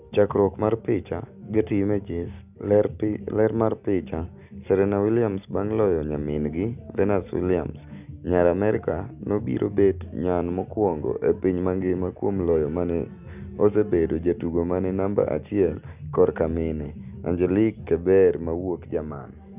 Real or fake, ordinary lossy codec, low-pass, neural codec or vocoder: real; none; 3.6 kHz; none